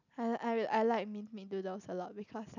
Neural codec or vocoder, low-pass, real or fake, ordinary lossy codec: none; 7.2 kHz; real; none